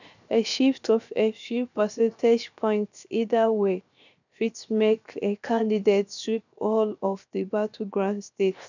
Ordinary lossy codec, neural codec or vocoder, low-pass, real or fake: none; codec, 16 kHz, 0.7 kbps, FocalCodec; 7.2 kHz; fake